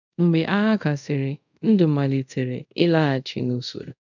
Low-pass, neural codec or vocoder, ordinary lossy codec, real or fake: 7.2 kHz; codec, 24 kHz, 0.5 kbps, DualCodec; none; fake